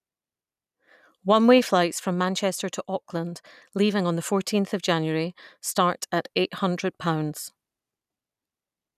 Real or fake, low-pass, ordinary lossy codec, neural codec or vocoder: real; 14.4 kHz; none; none